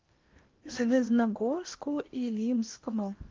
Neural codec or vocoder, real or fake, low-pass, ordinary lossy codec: codec, 16 kHz in and 24 kHz out, 0.8 kbps, FocalCodec, streaming, 65536 codes; fake; 7.2 kHz; Opus, 24 kbps